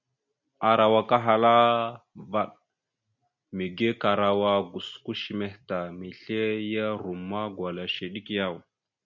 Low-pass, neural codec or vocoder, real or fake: 7.2 kHz; none; real